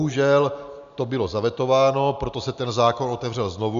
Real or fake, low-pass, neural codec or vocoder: real; 7.2 kHz; none